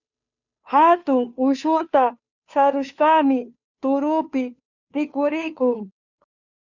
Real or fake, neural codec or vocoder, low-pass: fake; codec, 16 kHz, 2 kbps, FunCodec, trained on Chinese and English, 25 frames a second; 7.2 kHz